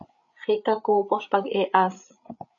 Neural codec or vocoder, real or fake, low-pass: codec, 16 kHz, 8 kbps, FreqCodec, larger model; fake; 7.2 kHz